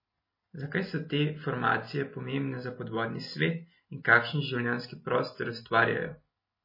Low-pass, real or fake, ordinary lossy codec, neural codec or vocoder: 5.4 kHz; real; MP3, 24 kbps; none